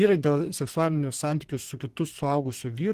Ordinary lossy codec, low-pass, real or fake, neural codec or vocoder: Opus, 16 kbps; 14.4 kHz; fake; codec, 32 kHz, 1.9 kbps, SNAC